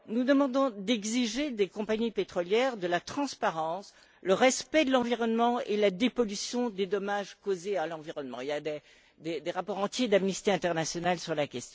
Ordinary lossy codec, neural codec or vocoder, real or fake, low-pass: none; none; real; none